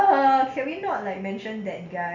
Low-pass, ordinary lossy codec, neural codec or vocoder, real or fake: 7.2 kHz; none; none; real